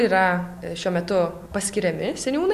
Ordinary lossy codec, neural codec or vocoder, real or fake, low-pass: MP3, 64 kbps; none; real; 14.4 kHz